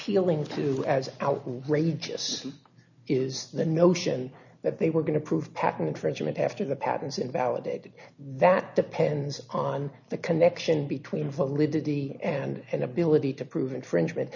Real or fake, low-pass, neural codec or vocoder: real; 7.2 kHz; none